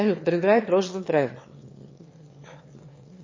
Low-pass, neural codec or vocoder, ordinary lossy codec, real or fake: 7.2 kHz; autoencoder, 22.05 kHz, a latent of 192 numbers a frame, VITS, trained on one speaker; MP3, 32 kbps; fake